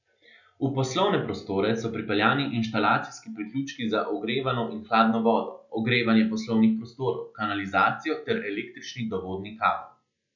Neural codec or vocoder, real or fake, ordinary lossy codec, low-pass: none; real; none; 7.2 kHz